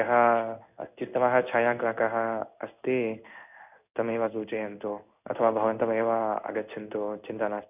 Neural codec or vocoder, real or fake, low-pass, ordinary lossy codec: codec, 16 kHz in and 24 kHz out, 1 kbps, XY-Tokenizer; fake; 3.6 kHz; none